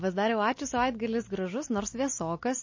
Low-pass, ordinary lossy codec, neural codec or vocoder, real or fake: 7.2 kHz; MP3, 32 kbps; none; real